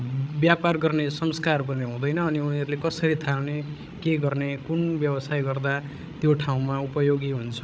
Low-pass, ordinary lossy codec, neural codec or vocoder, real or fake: none; none; codec, 16 kHz, 16 kbps, FreqCodec, larger model; fake